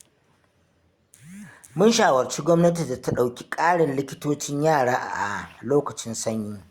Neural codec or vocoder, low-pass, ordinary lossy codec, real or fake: none; 19.8 kHz; Opus, 64 kbps; real